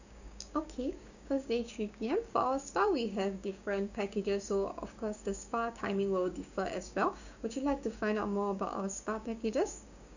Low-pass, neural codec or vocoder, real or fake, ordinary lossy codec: 7.2 kHz; codec, 16 kHz, 6 kbps, DAC; fake; none